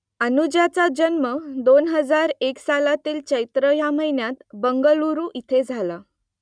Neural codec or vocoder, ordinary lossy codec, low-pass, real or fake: none; none; 9.9 kHz; real